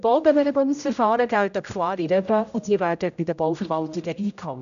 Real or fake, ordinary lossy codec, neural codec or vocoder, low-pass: fake; MP3, 96 kbps; codec, 16 kHz, 0.5 kbps, X-Codec, HuBERT features, trained on general audio; 7.2 kHz